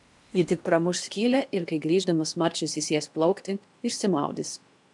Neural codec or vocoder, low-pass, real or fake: codec, 16 kHz in and 24 kHz out, 0.8 kbps, FocalCodec, streaming, 65536 codes; 10.8 kHz; fake